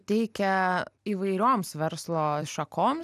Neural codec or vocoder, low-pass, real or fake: none; 14.4 kHz; real